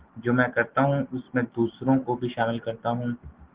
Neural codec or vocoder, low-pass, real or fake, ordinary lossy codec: none; 3.6 kHz; real; Opus, 16 kbps